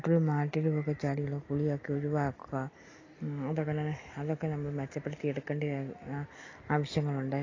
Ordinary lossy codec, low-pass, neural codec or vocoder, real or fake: AAC, 32 kbps; 7.2 kHz; none; real